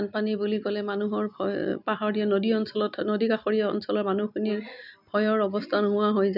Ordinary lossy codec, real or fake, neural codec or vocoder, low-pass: none; real; none; 5.4 kHz